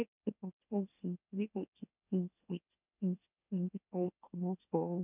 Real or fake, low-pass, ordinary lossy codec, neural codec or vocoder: fake; 3.6 kHz; none; autoencoder, 44.1 kHz, a latent of 192 numbers a frame, MeloTTS